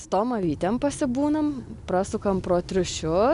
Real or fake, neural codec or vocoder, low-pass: real; none; 10.8 kHz